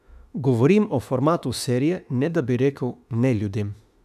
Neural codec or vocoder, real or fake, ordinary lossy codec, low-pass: autoencoder, 48 kHz, 32 numbers a frame, DAC-VAE, trained on Japanese speech; fake; none; 14.4 kHz